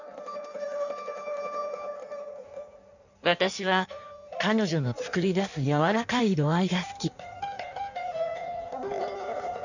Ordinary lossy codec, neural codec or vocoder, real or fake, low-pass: none; codec, 16 kHz in and 24 kHz out, 1.1 kbps, FireRedTTS-2 codec; fake; 7.2 kHz